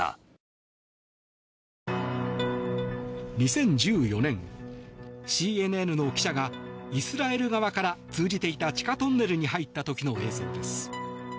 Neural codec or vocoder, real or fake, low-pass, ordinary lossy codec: none; real; none; none